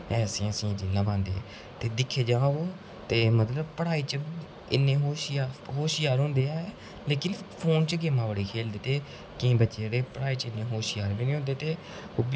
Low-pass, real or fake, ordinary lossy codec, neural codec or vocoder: none; real; none; none